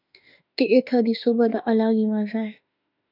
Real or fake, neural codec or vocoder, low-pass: fake; autoencoder, 48 kHz, 32 numbers a frame, DAC-VAE, trained on Japanese speech; 5.4 kHz